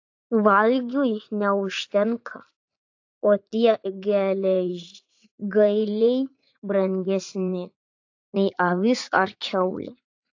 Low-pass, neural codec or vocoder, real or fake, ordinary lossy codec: 7.2 kHz; vocoder, 44.1 kHz, 80 mel bands, Vocos; fake; AAC, 48 kbps